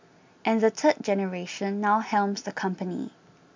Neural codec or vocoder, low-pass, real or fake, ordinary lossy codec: none; 7.2 kHz; real; MP3, 64 kbps